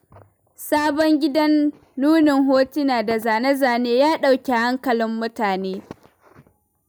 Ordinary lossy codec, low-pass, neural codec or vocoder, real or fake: none; none; none; real